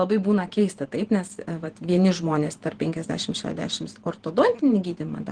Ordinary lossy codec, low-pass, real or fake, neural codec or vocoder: Opus, 16 kbps; 9.9 kHz; fake; vocoder, 48 kHz, 128 mel bands, Vocos